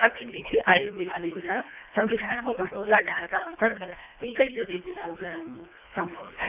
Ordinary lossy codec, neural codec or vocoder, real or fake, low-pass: none; codec, 24 kHz, 1.5 kbps, HILCodec; fake; 3.6 kHz